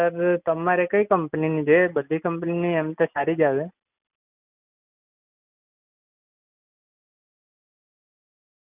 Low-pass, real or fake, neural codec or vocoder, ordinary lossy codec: 3.6 kHz; real; none; none